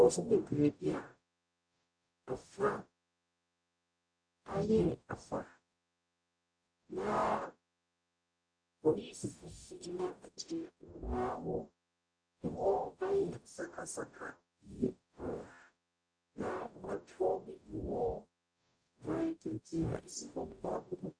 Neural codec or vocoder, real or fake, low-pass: codec, 44.1 kHz, 0.9 kbps, DAC; fake; 9.9 kHz